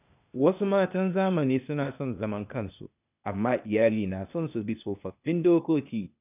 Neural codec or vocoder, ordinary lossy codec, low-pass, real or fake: codec, 16 kHz, 0.8 kbps, ZipCodec; none; 3.6 kHz; fake